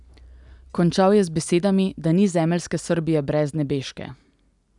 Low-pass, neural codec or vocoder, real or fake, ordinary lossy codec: 10.8 kHz; none; real; none